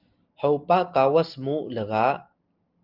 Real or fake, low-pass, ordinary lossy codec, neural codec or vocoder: real; 5.4 kHz; Opus, 32 kbps; none